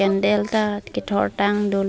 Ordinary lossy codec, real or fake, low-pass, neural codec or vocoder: none; real; none; none